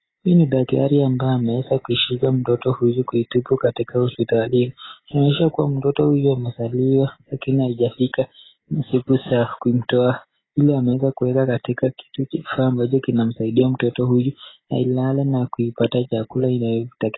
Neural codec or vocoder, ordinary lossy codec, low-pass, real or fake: none; AAC, 16 kbps; 7.2 kHz; real